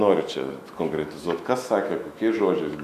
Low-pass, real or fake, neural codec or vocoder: 14.4 kHz; fake; autoencoder, 48 kHz, 128 numbers a frame, DAC-VAE, trained on Japanese speech